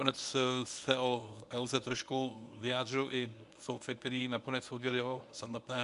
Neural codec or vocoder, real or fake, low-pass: codec, 24 kHz, 0.9 kbps, WavTokenizer, medium speech release version 1; fake; 10.8 kHz